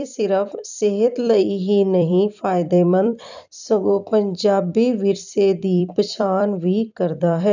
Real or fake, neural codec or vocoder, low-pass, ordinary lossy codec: fake; vocoder, 44.1 kHz, 128 mel bands every 256 samples, BigVGAN v2; 7.2 kHz; none